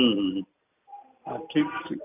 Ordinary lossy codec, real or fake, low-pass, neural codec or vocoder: none; real; 3.6 kHz; none